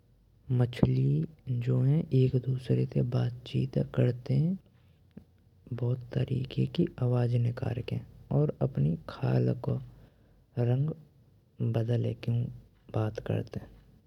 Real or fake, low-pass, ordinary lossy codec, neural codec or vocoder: real; 19.8 kHz; none; none